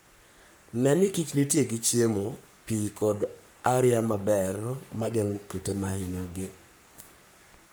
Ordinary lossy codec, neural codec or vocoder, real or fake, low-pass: none; codec, 44.1 kHz, 3.4 kbps, Pupu-Codec; fake; none